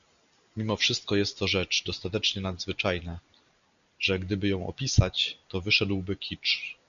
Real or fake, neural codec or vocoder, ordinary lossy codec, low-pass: real; none; MP3, 96 kbps; 7.2 kHz